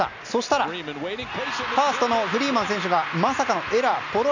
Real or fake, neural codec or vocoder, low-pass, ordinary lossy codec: real; none; 7.2 kHz; none